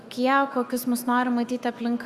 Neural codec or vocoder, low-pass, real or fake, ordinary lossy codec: autoencoder, 48 kHz, 128 numbers a frame, DAC-VAE, trained on Japanese speech; 14.4 kHz; fake; Opus, 64 kbps